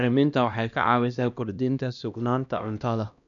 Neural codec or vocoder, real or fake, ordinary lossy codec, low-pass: codec, 16 kHz, 1 kbps, X-Codec, HuBERT features, trained on LibriSpeech; fake; none; 7.2 kHz